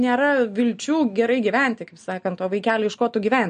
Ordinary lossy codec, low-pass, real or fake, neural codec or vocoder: MP3, 48 kbps; 9.9 kHz; real; none